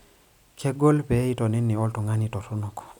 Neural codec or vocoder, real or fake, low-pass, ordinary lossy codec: none; real; 19.8 kHz; none